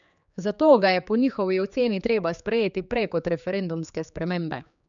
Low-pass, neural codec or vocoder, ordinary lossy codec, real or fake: 7.2 kHz; codec, 16 kHz, 4 kbps, X-Codec, HuBERT features, trained on general audio; none; fake